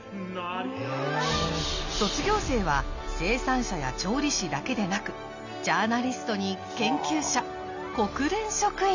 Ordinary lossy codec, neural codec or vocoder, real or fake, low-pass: none; none; real; 7.2 kHz